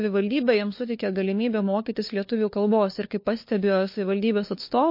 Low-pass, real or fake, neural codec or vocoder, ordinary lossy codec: 5.4 kHz; fake; codec, 16 kHz, 4 kbps, FunCodec, trained on LibriTTS, 50 frames a second; MP3, 32 kbps